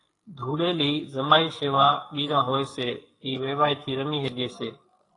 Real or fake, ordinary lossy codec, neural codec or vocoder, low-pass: fake; AAC, 32 kbps; codec, 44.1 kHz, 2.6 kbps, SNAC; 10.8 kHz